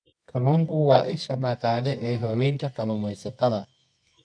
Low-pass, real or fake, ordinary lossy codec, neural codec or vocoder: 9.9 kHz; fake; none; codec, 24 kHz, 0.9 kbps, WavTokenizer, medium music audio release